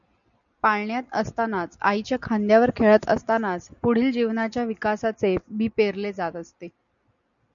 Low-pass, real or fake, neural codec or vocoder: 7.2 kHz; real; none